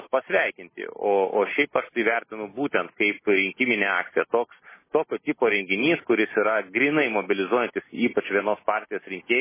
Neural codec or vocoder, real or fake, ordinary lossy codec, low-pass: none; real; MP3, 16 kbps; 3.6 kHz